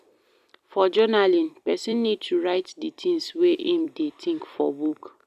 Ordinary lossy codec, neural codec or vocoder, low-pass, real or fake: none; vocoder, 44.1 kHz, 128 mel bands every 256 samples, BigVGAN v2; 14.4 kHz; fake